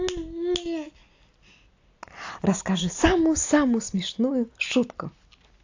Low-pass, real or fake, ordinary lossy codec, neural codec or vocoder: 7.2 kHz; real; AAC, 48 kbps; none